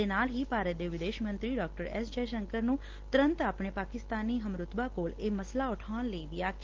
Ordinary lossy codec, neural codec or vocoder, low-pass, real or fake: Opus, 16 kbps; none; 7.2 kHz; real